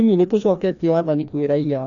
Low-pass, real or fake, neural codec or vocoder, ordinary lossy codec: 7.2 kHz; fake; codec, 16 kHz, 1 kbps, FreqCodec, larger model; MP3, 96 kbps